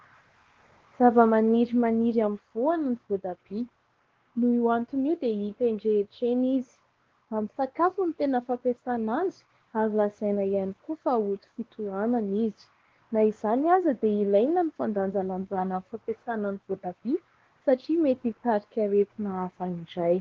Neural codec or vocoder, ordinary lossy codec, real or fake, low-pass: codec, 16 kHz, 2 kbps, X-Codec, WavLM features, trained on Multilingual LibriSpeech; Opus, 16 kbps; fake; 7.2 kHz